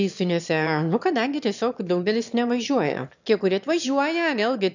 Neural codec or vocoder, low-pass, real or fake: autoencoder, 22.05 kHz, a latent of 192 numbers a frame, VITS, trained on one speaker; 7.2 kHz; fake